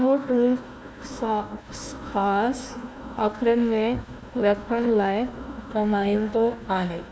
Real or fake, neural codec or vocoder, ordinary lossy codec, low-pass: fake; codec, 16 kHz, 1 kbps, FunCodec, trained on Chinese and English, 50 frames a second; none; none